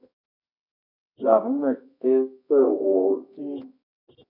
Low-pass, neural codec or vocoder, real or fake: 5.4 kHz; codec, 24 kHz, 0.9 kbps, WavTokenizer, medium music audio release; fake